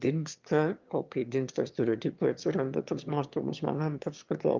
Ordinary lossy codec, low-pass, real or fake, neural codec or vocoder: Opus, 24 kbps; 7.2 kHz; fake; autoencoder, 22.05 kHz, a latent of 192 numbers a frame, VITS, trained on one speaker